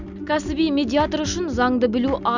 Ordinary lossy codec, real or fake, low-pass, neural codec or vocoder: none; real; 7.2 kHz; none